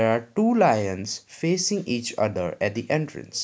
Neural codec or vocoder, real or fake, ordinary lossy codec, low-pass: none; real; none; none